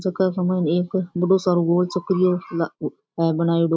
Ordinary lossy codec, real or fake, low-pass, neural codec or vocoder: none; real; none; none